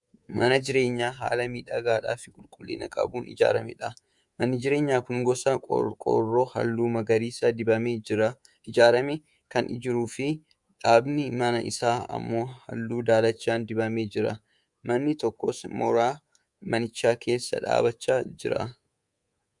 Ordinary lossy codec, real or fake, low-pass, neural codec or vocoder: Opus, 64 kbps; fake; 10.8 kHz; codec, 24 kHz, 3.1 kbps, DualCodec